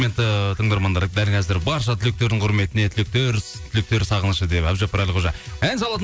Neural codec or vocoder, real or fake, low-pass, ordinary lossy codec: none; real; none; none